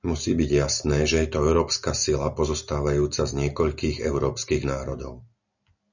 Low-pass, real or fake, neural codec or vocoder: 7.2 kHz; real; none